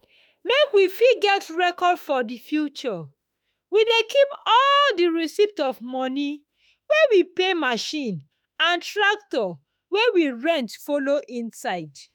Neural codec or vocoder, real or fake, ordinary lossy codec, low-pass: autoencoder, 48 kHz, 32 numbers a frame, DAC-VAE, trained on Japanese speech; fake; none; none